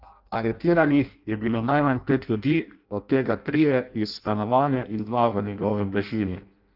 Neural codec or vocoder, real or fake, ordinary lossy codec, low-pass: codec, 16 kHz in and 24 kHz out, 0.6 kbps, FireRedTTS-2 codec; fake; Opus, 32 kbps; 5.4 kHz